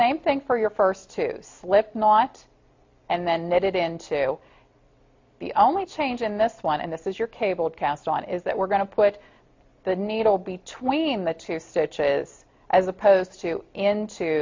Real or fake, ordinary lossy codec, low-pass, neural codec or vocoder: real; MP3, 48 kbps; 7.2 kHz; none